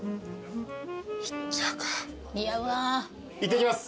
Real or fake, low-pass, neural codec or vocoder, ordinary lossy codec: real; none; none; none